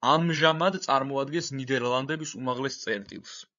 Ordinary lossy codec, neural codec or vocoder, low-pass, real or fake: MP3, 64 kbps; codec, 16 kHz, 8 kbps, FreqCodec, larger model; 7.2 kHz; fake